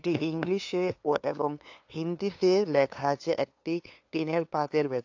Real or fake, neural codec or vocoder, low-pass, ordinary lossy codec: fake; codec, 16 kHz, 2 kbps, FunCodec, trained on LibriTTS, 25 frames a second; 7.2 kHz; AAC, 48 kbps